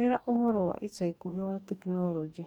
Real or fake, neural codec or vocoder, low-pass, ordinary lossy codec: fake; codec, 44.1 kHz, 2.6 kbps, DAC; 19.8 kHz; MP3, 96 kbps